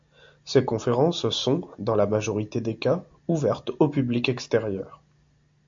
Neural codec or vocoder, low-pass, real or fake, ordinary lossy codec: none; 7.2 kHz; real; MP3, 48 kbps